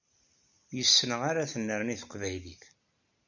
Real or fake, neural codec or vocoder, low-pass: real; none; 7.2 kHz